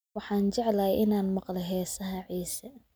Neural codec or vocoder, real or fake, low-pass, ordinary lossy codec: none; real; none; none